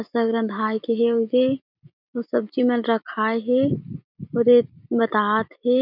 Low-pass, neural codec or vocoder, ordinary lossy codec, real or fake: 5.4 kHz; vocoder, 44.1 kHz, 128 mel bands every 256 samples, BigVGAN v2; none; fake